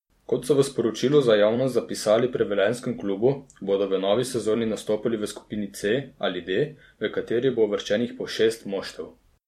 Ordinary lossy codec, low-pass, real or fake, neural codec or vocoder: MP3, 64 kbps; 19.8 kHz; fake; vocoder, 44.1 kHz, 128 mel bands every 256 samples, BigVGAN v2